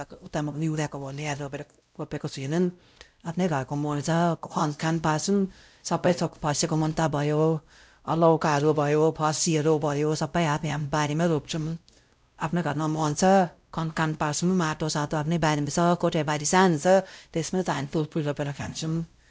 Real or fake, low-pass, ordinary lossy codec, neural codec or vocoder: fake; none; none; codec, 16 kHz, 0.5 kbps, X-Codec, WavLM features, trained on Multilingual LibriSpeech